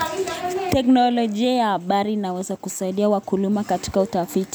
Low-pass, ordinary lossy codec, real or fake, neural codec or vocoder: none; none; real; none